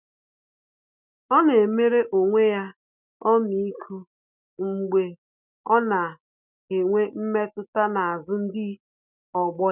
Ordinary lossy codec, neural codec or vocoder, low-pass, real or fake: none; none; 3.6 kHz; real